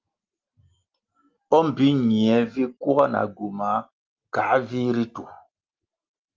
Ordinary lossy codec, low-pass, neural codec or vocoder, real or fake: Opus, 32 kbps; 7.2 kHz; none; real